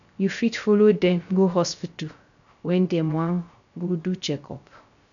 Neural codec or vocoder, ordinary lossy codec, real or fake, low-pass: codec, 16 kHz, 0.3 kbps, FocalCodec; none; fake; 7.2 kHz